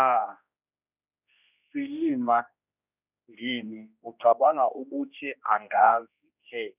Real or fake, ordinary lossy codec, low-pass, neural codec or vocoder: fake; none; 3.6 kHz; codec, 16 kHz, 1 kbps, X-Codec, HuBERT features, trained on general audio